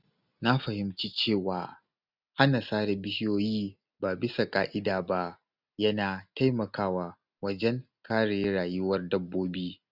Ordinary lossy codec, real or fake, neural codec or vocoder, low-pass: none; real; none; 5.4 kHz